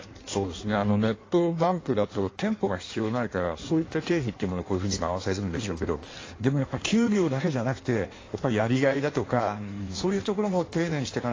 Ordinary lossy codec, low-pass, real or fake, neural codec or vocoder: AAC, 32 kbps; 7.2 kHz; fake; codec, 16 kHz in and 24 kHz out, 1.1 kbps, FireRedTTS-2 codec